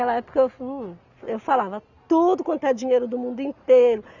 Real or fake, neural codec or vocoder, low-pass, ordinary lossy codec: real; none; 7.2 kHz; none